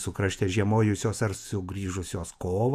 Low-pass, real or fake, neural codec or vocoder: 14.4 kHz; fake; vocoder, 48 kHz, 128 mel bands, Vocos